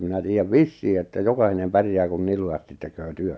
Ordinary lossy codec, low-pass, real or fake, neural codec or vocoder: none; none; real; none